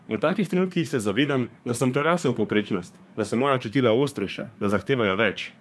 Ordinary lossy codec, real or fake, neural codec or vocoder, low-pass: none; fake; codec, 24 kHz, 1 kbps, SNAC; none